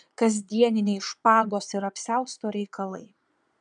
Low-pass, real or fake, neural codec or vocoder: 9.9 kHz; fake; vocoder, 22.05 kHz, 80 mel bands, Vocos